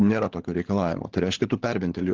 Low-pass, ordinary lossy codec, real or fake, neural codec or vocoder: 7.2 kHz; Opus, 16 kbps; fake; codec, 16 kHz, 16 kbps, FunCodec, trained on LibriTTS, 50 frames a second